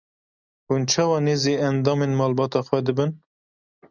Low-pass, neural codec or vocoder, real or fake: 7.2 kHz; none; real